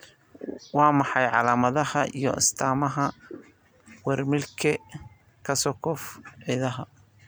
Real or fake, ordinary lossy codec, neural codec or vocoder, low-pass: real; none; none; none